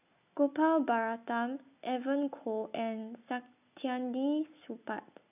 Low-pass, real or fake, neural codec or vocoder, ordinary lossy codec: 3.6 kHz; real; none; none